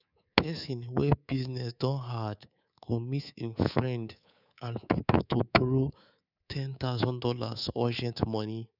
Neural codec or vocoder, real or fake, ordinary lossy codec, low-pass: codec, 24 kHz, 3.1 kbps, DualCodec; fake; none; 5.4 kHz